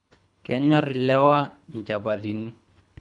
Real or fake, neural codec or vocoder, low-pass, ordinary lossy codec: fake; codec, 24 kHz, 3 kbps, HILCodec; 10.8 kHz; none